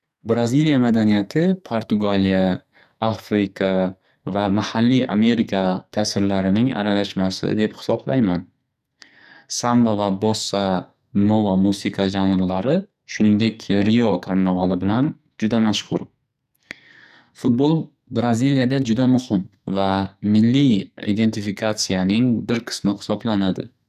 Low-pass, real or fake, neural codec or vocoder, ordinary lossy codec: 14.4 kHz; fake; codec, 44.1 kHz, 2.6 kbps, SNAC; none